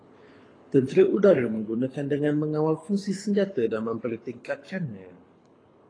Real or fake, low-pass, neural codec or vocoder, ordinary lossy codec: fake; 9.9 kHz; codec, 24 kHz, 6 kbps, HILCodec; AAC, 32 kbps